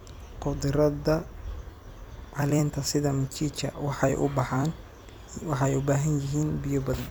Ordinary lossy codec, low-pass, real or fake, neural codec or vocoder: none; none; fake; vocoder, 44.1 kHz, 128 mel bands every 256 samples, BigVGAN v2